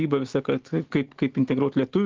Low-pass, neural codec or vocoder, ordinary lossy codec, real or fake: 7.2 kHz; none; Opus, 16 kbps; real